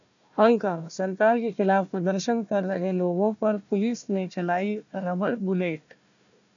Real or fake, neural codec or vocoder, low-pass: fake; codec, 16 kHz, 1 kbps, FunCodec, trained on Chinese and English, 50 frames a second; 7.2 kHz